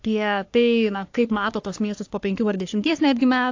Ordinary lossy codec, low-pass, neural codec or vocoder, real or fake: AAC, 48 kbps; 7.2 kHz; codec, 44.1 kHz, 3.4 kbps, Pupu-Codec; fake